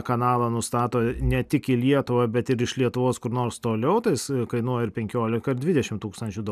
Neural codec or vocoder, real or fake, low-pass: none; real; 14.4 kHz